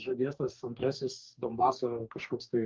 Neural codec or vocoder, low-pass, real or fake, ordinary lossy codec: codec, 44.1 kHz, 2.6 kbps, DAC; 7.2 kHz; fake; Opus, 24 kbps